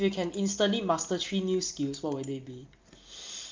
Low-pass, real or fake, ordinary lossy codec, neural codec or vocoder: 7.2 kHz; real; Opus, 24 kbps; none